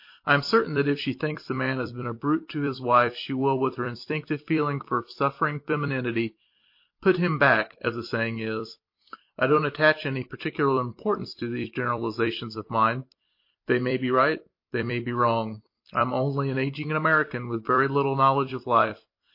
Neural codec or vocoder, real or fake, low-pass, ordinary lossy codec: vocoder, 44.1 kHz, 128 mel bands every 256 samples, BigVGAN v2; fake; 5.4 kHz; MP3, 32 kbps